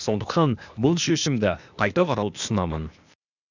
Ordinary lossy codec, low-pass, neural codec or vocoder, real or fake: none; 7.2 kHz; codec, 16 kHz, 0.8 kbps, ZipCodec; fake